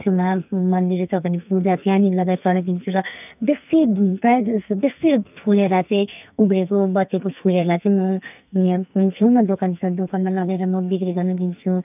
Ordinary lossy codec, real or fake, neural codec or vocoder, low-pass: none; fake; codec, 44.1 kHz, 2.6 kbps, SNAC; 3.6 kHz